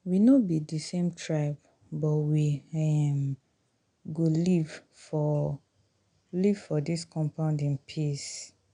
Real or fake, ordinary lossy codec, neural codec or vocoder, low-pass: real; none; none; 9.9 kHz